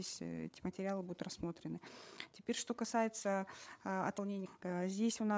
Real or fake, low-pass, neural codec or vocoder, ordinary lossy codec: fake; none; codec, 16 kHz, 8 kbps, FreqCodec, larger model; none